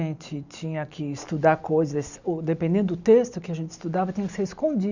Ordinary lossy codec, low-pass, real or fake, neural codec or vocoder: none; 7.2 kHz; real; none